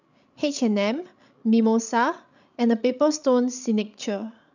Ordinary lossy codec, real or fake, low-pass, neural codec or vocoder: none; fake; 7.2 kHz; codec, 16 kHz, 16 kbps, FreqCodec, larger model